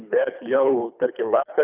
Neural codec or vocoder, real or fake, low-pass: codec, 24 kHz, 3 kbps, HILCodec; fake; 3.6 kHz